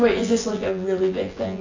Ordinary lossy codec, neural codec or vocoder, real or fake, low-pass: AAC, 32 kbps; vocoder, 24 kHz, 100 mel bands, Vocos; fake; 7.2 kHz